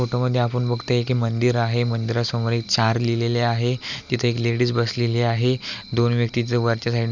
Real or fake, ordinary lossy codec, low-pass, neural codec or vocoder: real; none; 7.2 kHz; none